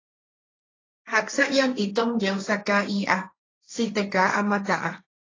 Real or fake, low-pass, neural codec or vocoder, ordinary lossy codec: fake; 7.2 kHz; codec, 16 kHz, 1.1 kbps, Voila-Tokenizer; AAC, 32 kbps